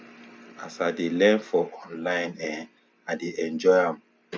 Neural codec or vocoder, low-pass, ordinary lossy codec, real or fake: none; none; none; real